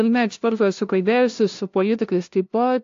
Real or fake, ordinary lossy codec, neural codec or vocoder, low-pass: fake; AAC, 48 kbps; codec, 16 kHz, 0.5 kbps, FunCodec, trained on LibriTTS, 25 frames a second; 7.2 kHz